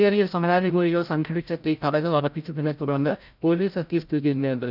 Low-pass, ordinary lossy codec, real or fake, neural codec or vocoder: 5.4 kHz; none; fake; codec, 16 kHz, 0.5 kbps, FreqCodec, larger model